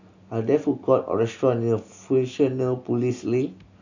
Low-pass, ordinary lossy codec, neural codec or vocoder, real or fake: 7.2 kHz; none; none; real